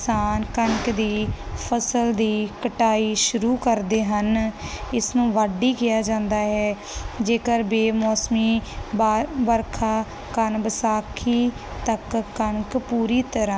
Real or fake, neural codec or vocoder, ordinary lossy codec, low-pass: real; none; none; none